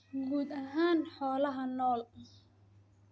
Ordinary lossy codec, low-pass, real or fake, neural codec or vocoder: none; none; real; none